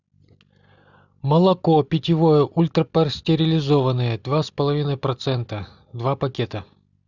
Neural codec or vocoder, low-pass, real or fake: none; 7.2 kHz; real